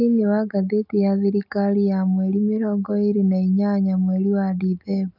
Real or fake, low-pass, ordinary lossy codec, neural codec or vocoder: real; 5.4 kHz; none; none